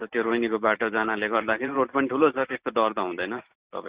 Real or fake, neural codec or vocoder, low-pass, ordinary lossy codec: fake; vocoder, 44.1 kHz, 128 mel bands every 512 samples, BigVGAN v2; 3.6 kHz; Opus, 64 kbps